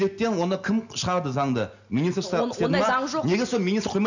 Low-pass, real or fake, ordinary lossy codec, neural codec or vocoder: 7.2 kHz; real; none; none